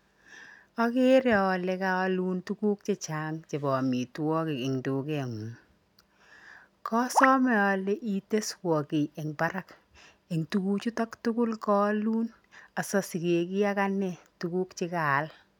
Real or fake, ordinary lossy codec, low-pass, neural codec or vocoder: real; none; 19.8 kHz; none